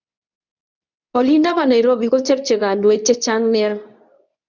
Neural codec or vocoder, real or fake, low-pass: codec, 24 kHz, 0.9 kbps, WavTokenizer, medium speech release version 2; fake; 7.2 kHz